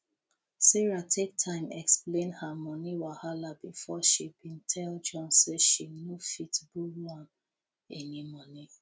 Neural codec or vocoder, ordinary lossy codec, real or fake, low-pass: none; none; real; none